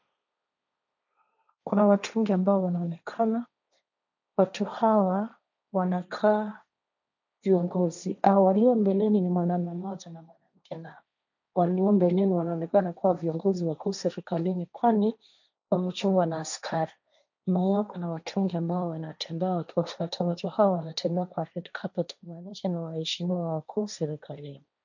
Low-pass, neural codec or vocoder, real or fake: 7.2 kHz; codec, 16 kHz, 1.1 kbps, Voila-Tokenizer; fake